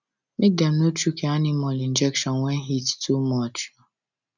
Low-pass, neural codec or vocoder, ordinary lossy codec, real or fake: 7.2 kHz; none; none; real